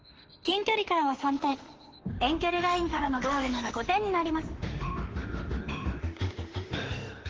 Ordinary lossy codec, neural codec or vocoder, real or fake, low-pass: Opus, 16 kbps; autoencoder, 48 kHz, 32 numbers a frame, DAC-VAE, trained on Japanese speech; fake; 7.2 kHz